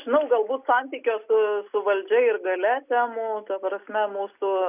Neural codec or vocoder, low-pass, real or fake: none; 3.6 kHz; real